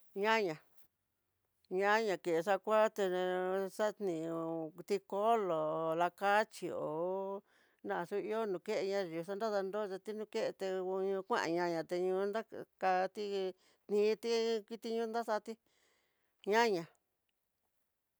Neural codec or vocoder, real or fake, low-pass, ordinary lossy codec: none; real; none; none